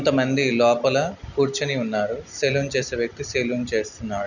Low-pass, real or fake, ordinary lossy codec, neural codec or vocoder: 7.2 kHz; real; none; none